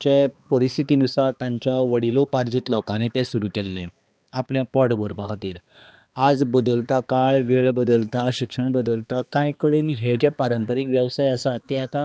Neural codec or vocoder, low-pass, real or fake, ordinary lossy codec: codec, 16 kHz, 2 kbps, X-Codec, HuBERT features, trained on balanced general audio; none; fake; none